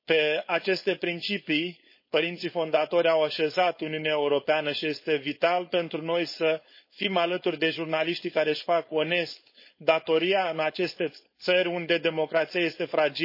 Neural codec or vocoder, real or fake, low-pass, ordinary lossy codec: codec, 16 kHz, 4.8 kbps, FACodec; fake; 5.4 kHz; MP3, 24 kbps